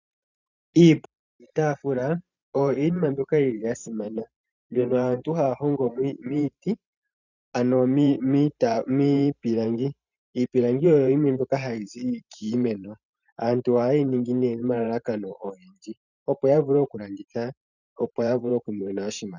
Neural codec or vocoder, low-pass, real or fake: vocoder, 44.1 kHz, 128 mel bands every 512 samples, BigVGAN v2; 7.2 kHz; fake